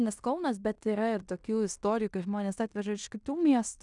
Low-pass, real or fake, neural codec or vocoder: 10.8 kHz; fake; codec, 16 kHz in and 24 kHz out, 0.9 kbps, LongCat-Audio-Codec, fine tuned four codebook decoder